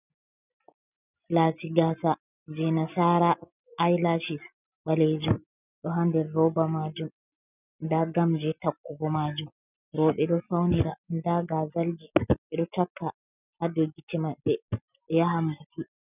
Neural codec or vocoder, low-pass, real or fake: none; 3.6 kHz; real